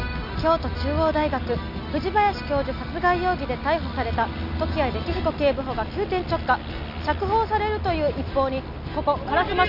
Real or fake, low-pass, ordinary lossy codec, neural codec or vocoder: real; 5.4 kHz; none; none